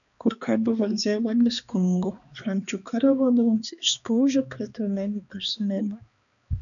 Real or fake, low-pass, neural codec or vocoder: fake; 7.2 kHz; codec, 16 kHz, 2 kbps, X-Codec, HuBERT features, trained on balanced general audio